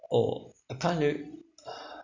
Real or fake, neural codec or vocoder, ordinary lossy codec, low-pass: real; none; none; 7.2 kHz